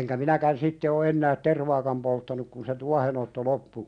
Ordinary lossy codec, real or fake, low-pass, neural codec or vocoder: none; real; 9.9 kHz; none